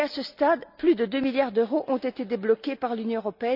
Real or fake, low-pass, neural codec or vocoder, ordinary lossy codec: real; 5.4 kHz; none; none